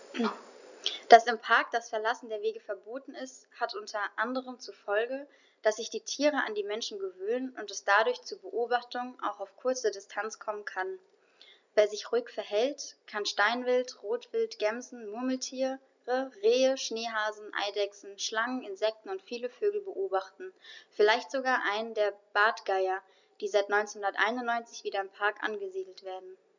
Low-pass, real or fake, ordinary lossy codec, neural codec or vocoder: 7.2 kHz; real; none; none